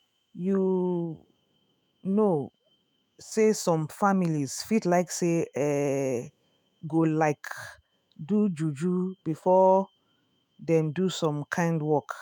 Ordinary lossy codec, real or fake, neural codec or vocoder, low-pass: none; fake; autoencoder, 48 kHz, 128 numbers a frame, DAC-VAE, trained on Japanese speech; none